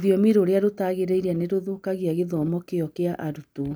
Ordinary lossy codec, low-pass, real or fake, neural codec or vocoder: none; none; real; none